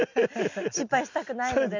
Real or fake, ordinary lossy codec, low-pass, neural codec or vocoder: real; none; 7.2 kHz; none